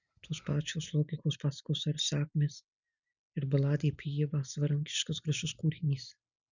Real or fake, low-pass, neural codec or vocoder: real; 7.2 kHz; none